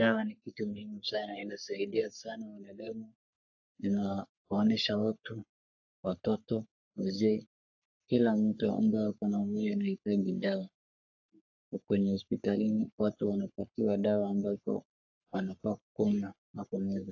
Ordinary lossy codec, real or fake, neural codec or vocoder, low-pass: AAC, 48 kbps; fake; codec, 44.1 kHz, 3.4 kbps, Pupu-Codec; 7.2 kHz